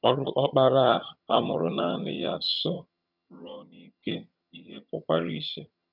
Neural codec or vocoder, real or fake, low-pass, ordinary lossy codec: vocoder, 22.05 kHz, 80 mel bands, HiFi-GAN; fake; 5.4 kHz; none